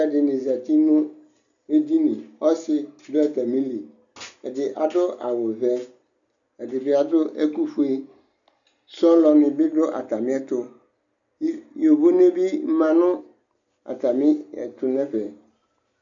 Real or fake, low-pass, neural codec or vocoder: real; 7.2 kHz; none